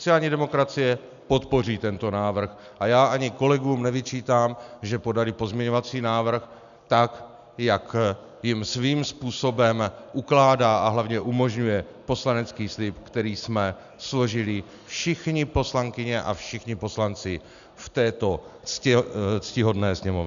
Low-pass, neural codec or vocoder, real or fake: 7.2 kHz; none; real